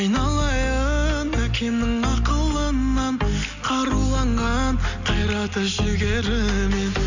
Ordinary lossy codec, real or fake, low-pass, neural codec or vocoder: none; real; 7.2 kHz; none